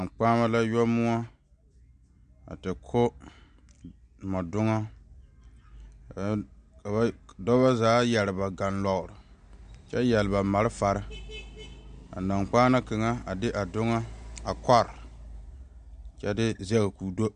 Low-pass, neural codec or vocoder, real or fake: 9.9 kHz; none; real